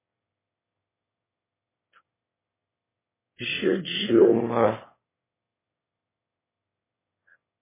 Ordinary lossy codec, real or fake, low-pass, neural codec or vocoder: MP3, 16 kbps; fake; 3.6 kHz; autoencoder, 22.05 kHz, a latent of 192 numbers a frame, VITS, trained on one speaker